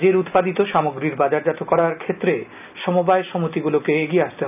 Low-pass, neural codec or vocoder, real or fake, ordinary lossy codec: 3.6 kHz; none; real; none